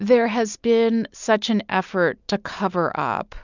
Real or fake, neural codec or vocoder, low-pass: real; none; 7.2 kHz